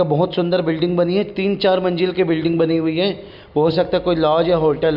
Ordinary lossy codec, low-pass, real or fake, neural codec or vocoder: none; 5.4 kHz; real; none